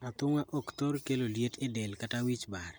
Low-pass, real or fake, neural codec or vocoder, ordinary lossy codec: none; real; none; none